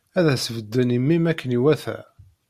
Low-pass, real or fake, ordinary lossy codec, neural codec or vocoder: 14.4 kHz; real; Opus, 64 kbps; none